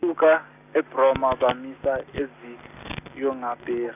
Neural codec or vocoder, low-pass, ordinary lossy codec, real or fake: none; 3.6 kHz; none; real